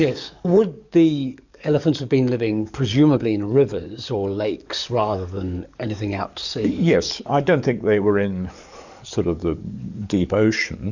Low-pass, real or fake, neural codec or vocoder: 7.2 kHz; fake; codec, 44.1 kHz, 7.8 kbps, DAC